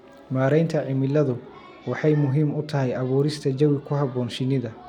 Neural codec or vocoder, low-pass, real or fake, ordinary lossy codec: none; 19.8 kHz; real; none